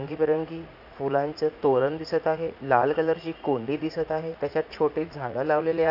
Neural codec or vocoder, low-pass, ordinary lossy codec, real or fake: vocoder, 44.1 kHz, 80 mel bands, Vocos; 5.4 kHz; none; fake